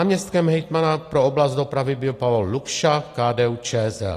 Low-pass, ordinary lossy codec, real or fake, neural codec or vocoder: 14.4 kHz; AAC, 48 kbps; real; none